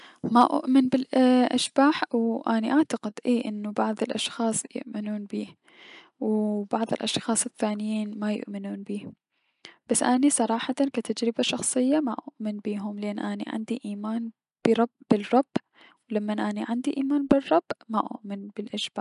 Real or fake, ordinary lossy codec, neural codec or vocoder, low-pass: real; none; none; 10.8 kHz